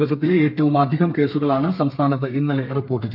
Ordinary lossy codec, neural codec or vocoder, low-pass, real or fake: none; codec, 32 kHz, 1.9 kbps, SNAC; 5.4 kHz; fake